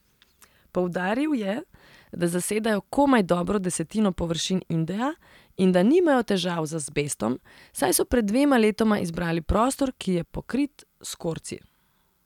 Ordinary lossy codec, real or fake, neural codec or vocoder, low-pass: none; real; none; 19.8 kHz